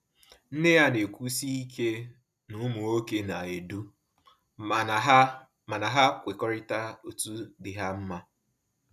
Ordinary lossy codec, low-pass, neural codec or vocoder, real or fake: none; 14.4 kHz; none; real